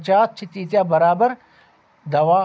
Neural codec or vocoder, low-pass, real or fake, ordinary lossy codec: none; none; real; none